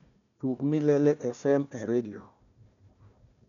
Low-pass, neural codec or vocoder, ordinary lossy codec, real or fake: 7.2 kHz; codec, 16 kHz, 1 kbps, FunCodec, trained on Chinese and English, 50 frames a second; none; fake